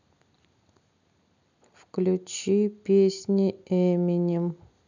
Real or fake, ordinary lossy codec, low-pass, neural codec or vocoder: real; none; 7.2 kHz; none